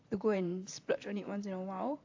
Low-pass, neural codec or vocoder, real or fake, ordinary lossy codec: 7.2 kHz; vocoder, 44.1 kHz, 128 mel bands, Pupu-Vocoder; fake; AAC, 48 kbps